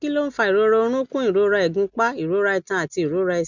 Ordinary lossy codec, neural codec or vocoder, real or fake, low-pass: none; none; real; 7.2 kHz